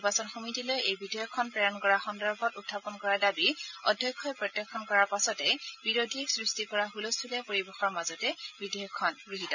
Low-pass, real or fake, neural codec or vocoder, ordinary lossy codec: 7.2 kHz; real; none; none